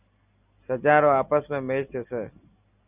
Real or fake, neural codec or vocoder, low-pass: real; none; 3.6 kHz